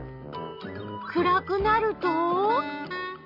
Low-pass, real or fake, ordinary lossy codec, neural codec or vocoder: 5.4 kHz; real; none; none